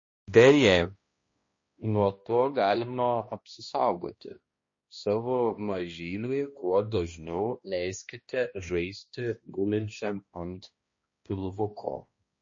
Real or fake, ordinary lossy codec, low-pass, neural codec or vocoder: fake; MP3, 32 kbps; 7.2 kHz; codec, 16 kHz, 1 kbps, X-Codec, HuBERT features, trained on balanced general audio